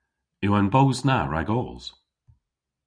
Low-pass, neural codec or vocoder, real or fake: 9.9 kHz; none; real